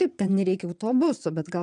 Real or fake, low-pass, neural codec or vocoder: fake; 9.9 kHz; vocoder, 22.05 kHz, 80 mel bands, Vocos